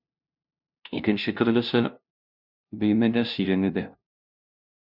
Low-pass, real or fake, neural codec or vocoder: 5.4 kHz; fake; codec, 16 kHz, 0.5 kbps, FunCodec, trained on LibriTTS, 25 frames a second